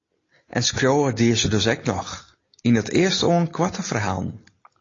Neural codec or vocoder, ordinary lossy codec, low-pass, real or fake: none; AAC, 32 kbps; 7.2 kHz; real